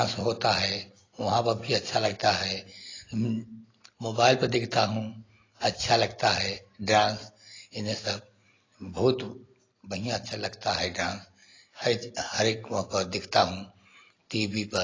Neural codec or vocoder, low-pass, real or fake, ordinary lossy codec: none; 7.2 kHz; real; AAC, 32 kbps